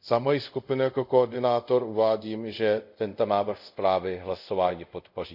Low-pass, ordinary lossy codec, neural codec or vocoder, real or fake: 5.4 kHz; none; codec, 24 kHz, 0.5 kbps, DualCodec; fake